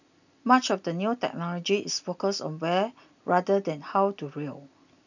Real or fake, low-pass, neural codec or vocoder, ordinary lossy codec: real; 7.2 kHz; none; none